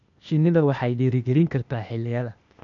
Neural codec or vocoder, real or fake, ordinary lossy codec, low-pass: codec, 16 kHz, 0.8 kbps, ZipCodec; fake; none; 7.2 kHz